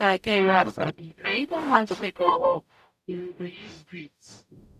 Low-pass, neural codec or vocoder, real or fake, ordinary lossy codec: 14.4 kHz; codec, 44.1 kHz, 0.9 kbps, DAC; fake; none